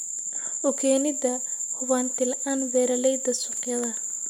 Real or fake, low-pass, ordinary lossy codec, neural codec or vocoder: real; 19.8 kHz; none; none